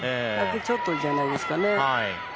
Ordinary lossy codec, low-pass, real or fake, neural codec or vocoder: none; none; real; none